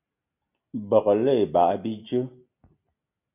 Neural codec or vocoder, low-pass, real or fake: none; 3.6 kHz; real